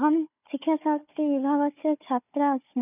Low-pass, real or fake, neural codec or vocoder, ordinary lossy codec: 3.6 kHz; fake; codec, 16 kHz, 4 kbps, FunCodec, trained on Chinese and English, 50 frames a second; none